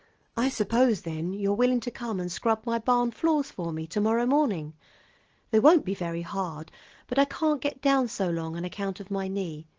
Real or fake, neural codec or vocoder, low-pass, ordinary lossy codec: real; none; 7.2 kHz; Opus, 16 kbps